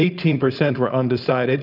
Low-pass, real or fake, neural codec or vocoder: 5.4 kHz; fake; codec, 16 kHz, 4.8 kbps, FACodec